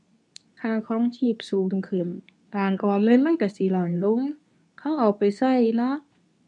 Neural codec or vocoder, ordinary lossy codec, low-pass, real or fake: codec, 24 kHz, 0.9 kbps, WavTokenizer, medium speech release version 2; none; 10.8 kHz; fake